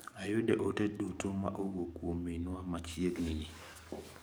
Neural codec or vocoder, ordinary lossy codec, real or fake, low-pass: codec, 44.1 kHz, 7.8 kbps, DAC; none; fake; none